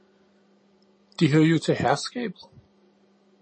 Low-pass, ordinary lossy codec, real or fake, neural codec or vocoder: 9.9 kHz; MP3, 32 kbps; real; none